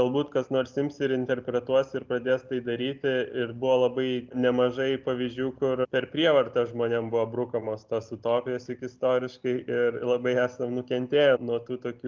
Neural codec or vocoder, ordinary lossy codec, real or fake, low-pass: none; Opus, 32 kbps; real; 7.2 kHz